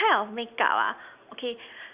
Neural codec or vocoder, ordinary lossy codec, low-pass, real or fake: none; Opus, 64 kbps; 3.6 kHz; real